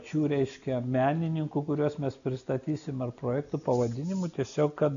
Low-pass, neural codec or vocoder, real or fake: 7.2 kHz; none; real